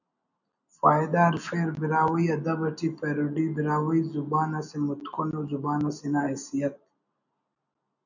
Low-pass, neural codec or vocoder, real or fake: 7.2 kHz; none; real